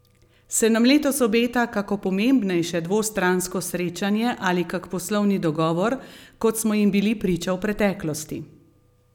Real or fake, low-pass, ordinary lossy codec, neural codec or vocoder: real; 19.8 kHz; none; none